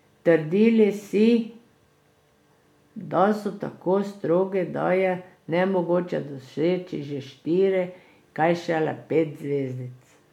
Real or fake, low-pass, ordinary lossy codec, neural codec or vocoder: real; 19.8 kHz; none; none